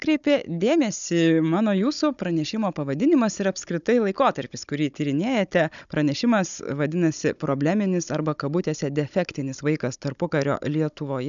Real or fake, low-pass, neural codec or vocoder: fake; 7.2 kHz; codec, 16 kHz, 16 kbps, FunCodec, trained on Chinese and English, 50 frames a second